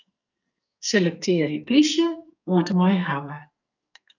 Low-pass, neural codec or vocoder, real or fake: 7.2 kHz; codec, 44.1 kHz, 2.6 kbps, SNAC; fake